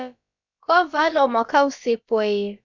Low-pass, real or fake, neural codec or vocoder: 7.2 kHz; fake; codec, 16 kHz, about 1 kbps, DyCAST, with the encoder's durations